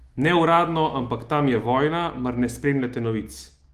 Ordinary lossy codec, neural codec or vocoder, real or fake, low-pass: Opus, 24 kbps; autoencoder, 48 kHz, 128 numbers a frame, DAC-VAE, trained on Japanese speech; fake; 14.4 kHz